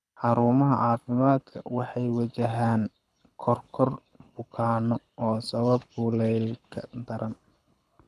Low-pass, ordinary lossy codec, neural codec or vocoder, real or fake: none; none; codec, 24 kHz, 6 kbps, HILCodec; fake